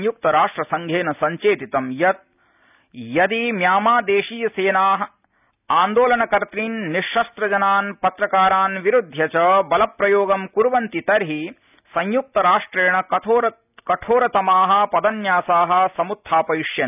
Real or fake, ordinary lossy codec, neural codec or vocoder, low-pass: real; none; none; 3.6 kHz